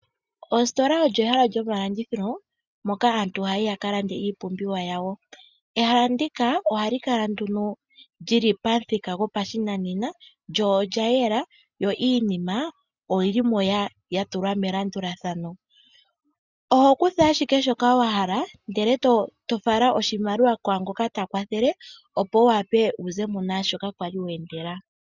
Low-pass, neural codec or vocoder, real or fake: 7.2 kHz; none; real